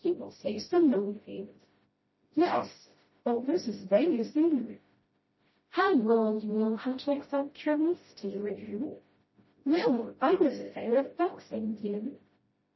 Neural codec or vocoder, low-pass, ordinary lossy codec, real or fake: codec, 16 kHz, 0.5 kbps, FreqCodec, smaller model; 7.2 kHz; MP3, 24 kbps; fake